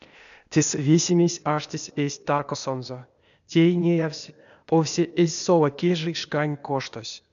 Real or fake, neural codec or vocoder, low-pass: fake; codec, 16 kHz, 0.8 kbps, ZipCodec; 7.2 kHz